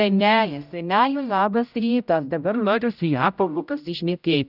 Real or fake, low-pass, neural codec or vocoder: fake; 5.4 kHz; codec, 16 kHz, 0.5 kbps, X-Codec, HuBERT features, trained on general audio